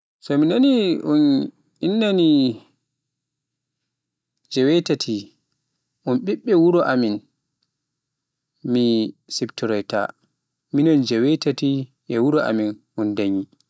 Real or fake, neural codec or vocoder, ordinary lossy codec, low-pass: real; none; none; none